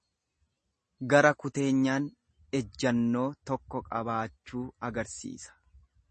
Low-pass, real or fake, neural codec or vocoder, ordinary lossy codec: 10.8 kHz; real; none; MP3, 32 kbps